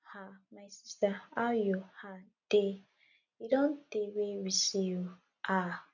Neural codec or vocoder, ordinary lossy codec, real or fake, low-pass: none; none; real; 7.2 kHz